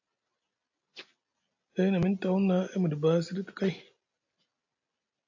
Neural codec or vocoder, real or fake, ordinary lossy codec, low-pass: none; real; AAC, 48 kbps; 7.2 kHz